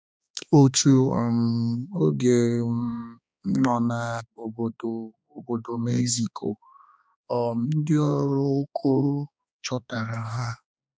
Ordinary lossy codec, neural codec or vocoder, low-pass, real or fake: none; codec, 16 kHz, 2 kbps, X-Codec, HuBERT features, trained on balanced general audio; none; fake